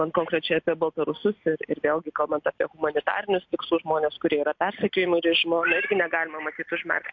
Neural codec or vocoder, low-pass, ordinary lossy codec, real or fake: none; 7.2 kHz; MP3, 48 kbps; real